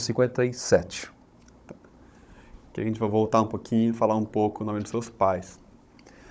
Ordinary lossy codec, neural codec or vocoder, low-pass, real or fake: none; codec, 16 kHz, 16 kbps, FunCodec, trained on Chinese and English, 50 frames a second; none; fake